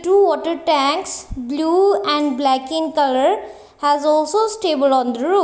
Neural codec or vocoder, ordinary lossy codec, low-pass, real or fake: none; none; none; real